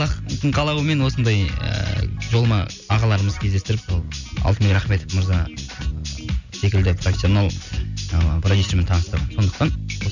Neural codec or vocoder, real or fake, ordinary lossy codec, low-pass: none; real; none; 7.2 kHz